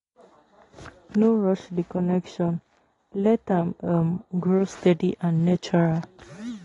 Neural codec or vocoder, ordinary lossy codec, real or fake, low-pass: none; AAC, 32 kbps; real; 10.8 kHz